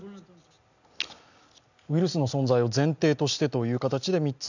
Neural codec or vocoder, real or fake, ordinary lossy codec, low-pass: none; real; none; 7.2 kHz